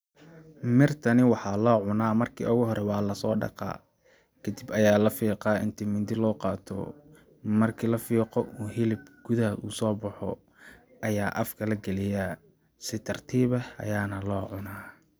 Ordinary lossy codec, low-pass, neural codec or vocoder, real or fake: none; none; none; real